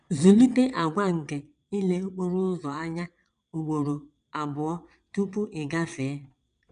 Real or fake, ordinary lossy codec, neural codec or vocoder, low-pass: fake; none; vocoder, 22.05 kHz, 80 mel bands, WaveNeXt; 9.9 kHz